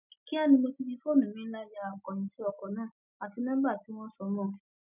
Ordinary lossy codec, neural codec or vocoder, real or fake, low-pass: none; none; real; 3.6 kHz